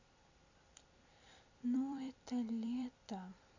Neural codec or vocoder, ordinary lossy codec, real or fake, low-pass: vocoder, 44.1 kHz, 128 mel bands every 256 samples, BigVGAN v2; MP3, 64 kbps; fake; 7.2 kHz